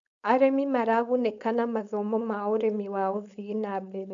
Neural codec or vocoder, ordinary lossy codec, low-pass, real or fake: codec, 16 kHz, 4.8 kbps, FACodec; none; 7.2 kHz; fake